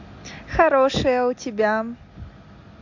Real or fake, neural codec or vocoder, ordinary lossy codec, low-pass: real; none; AAC, 48 kbps; 7.2 kHz